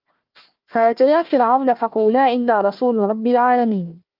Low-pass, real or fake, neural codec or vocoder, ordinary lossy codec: 5.4 kHz; fake; codec, 16 kHz, 1 kbps, FunCodec, trained on Chinese and English, 50 frames a second; Opus, 32 kbps